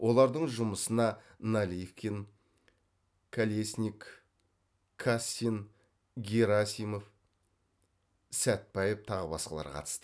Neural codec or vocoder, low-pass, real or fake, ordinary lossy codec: none; none; real; none